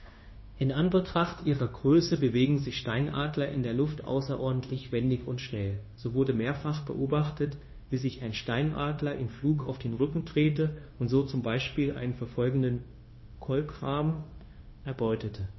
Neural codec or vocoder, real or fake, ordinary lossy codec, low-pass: codec, 16 kHz, 0.9 kbps, LongCat-Audio-Codec; fake; MP3, 24 kbps; 7.2 kHz